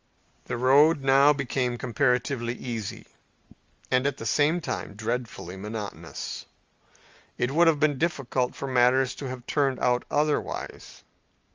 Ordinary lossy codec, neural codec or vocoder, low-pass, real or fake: Opus, 32 kbps; none; 7.2 kHz; real